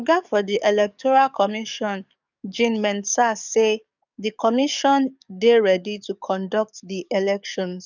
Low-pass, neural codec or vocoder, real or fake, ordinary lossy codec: 7.2 kHz; codec, 44.1 kHz, 7.8 kbps, DAC; fake; none